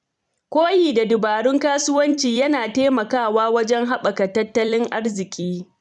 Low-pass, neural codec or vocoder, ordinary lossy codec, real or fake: 10.8 kHz; vocoder, 44.1 kHz, 128 mel bands every 256 samples, BigVGAN v2; none; fake